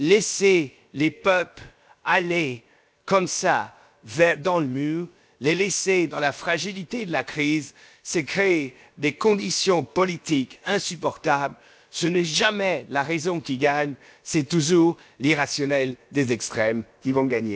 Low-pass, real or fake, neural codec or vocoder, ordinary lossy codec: none; fake; codec, 16 kHz, about 1 kbps, DyCAST, with the encoder's durations; none